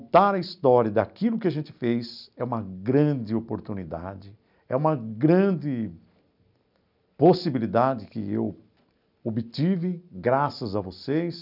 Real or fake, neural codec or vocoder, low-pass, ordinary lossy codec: real; none; 5.4 kHz; none